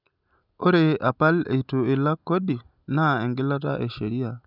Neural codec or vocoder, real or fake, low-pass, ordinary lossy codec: none; real; 5.4 kHz; none